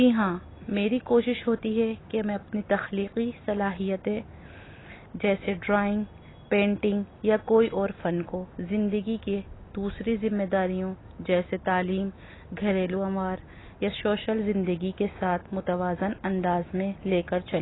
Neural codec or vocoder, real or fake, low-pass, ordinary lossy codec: none; real; 7.2 kHz; AAC, 16 kbps